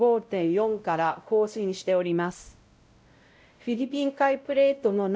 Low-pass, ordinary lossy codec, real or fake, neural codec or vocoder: none; none; fake; codec, 16 kHz, 0.5 kbps, X-Codec, WavLM features, trained on Multilingual LibriSpeech